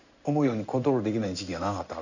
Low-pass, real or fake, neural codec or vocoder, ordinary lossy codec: 7.2 kHz; fake; codec, 16 kHz in and 24 kHz out, 1 kbps, XY-Tokenizer; none